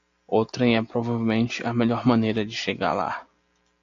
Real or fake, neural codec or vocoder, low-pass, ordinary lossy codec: real; none; 7.2 kHz; AAC, 48 kbps